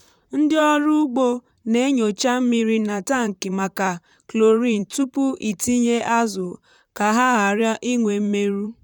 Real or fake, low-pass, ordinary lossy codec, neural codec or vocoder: fake; 19.8 kHz; none; vocoder, 44.1 kHz, 128 mel bands, Pupu-Vocoder